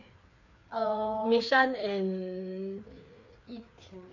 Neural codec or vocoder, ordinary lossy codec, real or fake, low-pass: codec, 16 kHz, 4 kbps, FreqCodec, larger model; none; fake; 7.2 kHz